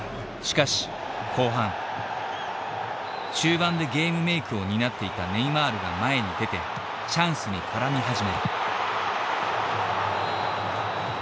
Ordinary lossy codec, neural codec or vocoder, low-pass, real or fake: none; none; none; real